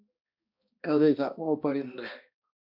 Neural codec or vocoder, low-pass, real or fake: codec, 16 kHz, 1 kbps, X-Codec, HuBERT features, trained on balanced general audio; 5.4 kHz; fake